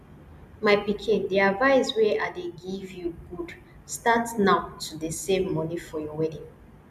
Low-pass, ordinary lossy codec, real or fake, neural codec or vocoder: 14.4 kHz; none; real; none